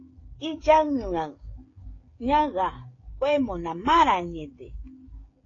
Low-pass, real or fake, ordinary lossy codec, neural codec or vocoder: 7.2 kHz; fake; AAC, 32 kbps; codec, 16 kHz, 16 kbps, FreqCodec, smaller model